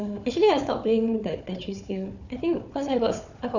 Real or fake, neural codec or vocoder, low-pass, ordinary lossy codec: fake; codec, 16 kHz, 4 kbps, FunCodec, trained on Chinese and English, 50 frames a second; 7.2 kHz; none